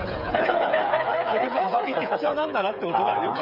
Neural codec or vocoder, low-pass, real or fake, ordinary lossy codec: codec, 16 kHz, 8 kbps, FreqCodec, smaller model; 5.4 kHz; fake; none